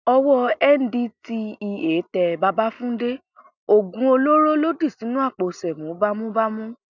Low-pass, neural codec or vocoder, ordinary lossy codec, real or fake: 7.2 kHz; none; none; real